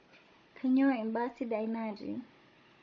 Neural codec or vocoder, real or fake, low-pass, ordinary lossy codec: codec, 16 kHz, 16 kbps, FunCodec, trained on Chinese and English, 50 frames a second; fake; 7.2 kHz; MP3, 32 kbps